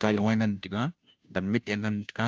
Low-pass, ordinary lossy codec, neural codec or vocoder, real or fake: none; none; codec, 16 kHz, 0.5 kbps, FunCodec, trained on Chinese and English, 25 frames a second; fake